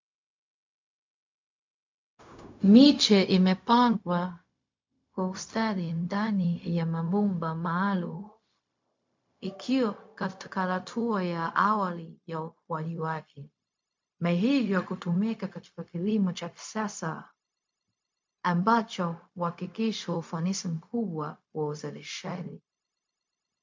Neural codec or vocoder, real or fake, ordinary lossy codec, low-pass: codec, 16 kHz, 0.4 kbps, LongCat-Audio-Codec; fake; MP3, 64 kbps; 7.2 kHz